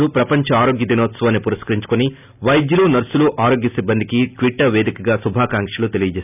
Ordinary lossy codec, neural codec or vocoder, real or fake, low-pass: none; none; real; 3.6 kHz